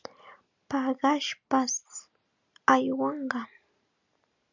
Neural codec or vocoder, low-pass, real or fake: none; 7.2 kHz; real